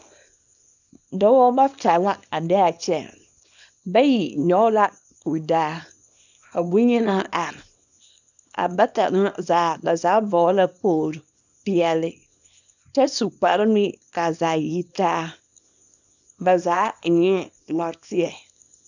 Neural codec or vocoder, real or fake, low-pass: codec, 24 kHz, 0.9 kbps, WavTokenizer, small release; fake; 7.2 kHz